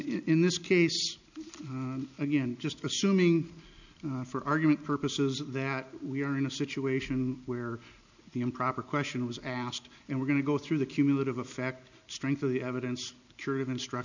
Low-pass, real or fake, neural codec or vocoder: 7.2 kHz; real; none